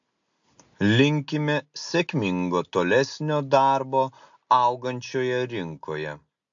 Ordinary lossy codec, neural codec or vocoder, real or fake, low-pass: AAC, 64 kbps; none; real; 7.2 kHz